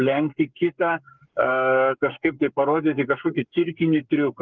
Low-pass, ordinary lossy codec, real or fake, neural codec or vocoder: 7.2 kHz; Opus, 24 kbps; fake; codec, 44.1 kHz, 7.8 kbps, Pupu-Codec